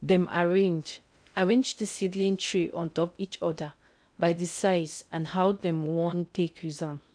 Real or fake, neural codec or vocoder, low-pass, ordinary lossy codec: fake; codec, 16 kHz in and 24 kHz out, 0.6 kbps, FocalCodec, streaming, 2048 codes; 9.9 kHz; none